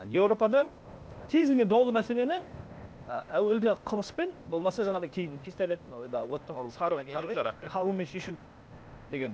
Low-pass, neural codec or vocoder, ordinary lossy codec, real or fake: none; codec, 16 kHz, 0.8 kbps, ZipCodec; none; fake